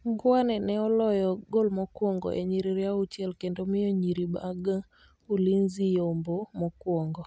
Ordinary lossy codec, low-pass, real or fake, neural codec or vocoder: none; none; real; none